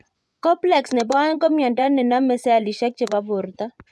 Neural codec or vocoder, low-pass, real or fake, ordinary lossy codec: vocoder, 24 kHz, 100 mel bands, Vocos; none; fake; none